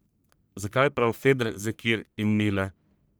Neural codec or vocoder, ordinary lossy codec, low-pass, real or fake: codec, 44.1 kHz, 1.7 kbps, Pupu-Codec; none; none; fake